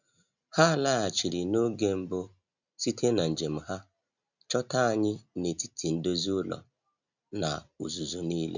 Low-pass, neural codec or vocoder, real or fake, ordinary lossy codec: 7.2 kHz; none; real; none